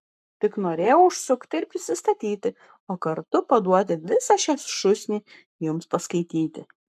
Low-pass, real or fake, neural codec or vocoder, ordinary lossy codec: 14.4 kHz; fake; codec, 44.1 kHz, 7.8 kbps, Pupu-Codec; MP3, 96 kbps